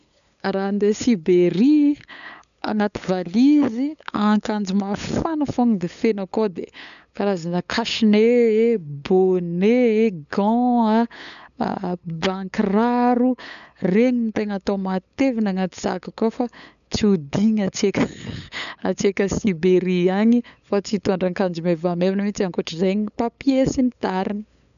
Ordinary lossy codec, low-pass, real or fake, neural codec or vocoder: none; 7.2 kHz; fake; codec, 16 kHz, 8 kbps, FunCodec, trained on LibriTTS, 25 frames a second